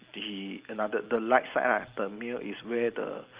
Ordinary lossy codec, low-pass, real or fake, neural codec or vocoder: Opus, 32 kbps; 3.6 kHz; real; none